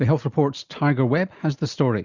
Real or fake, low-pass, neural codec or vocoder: real; 7.2 kHz; none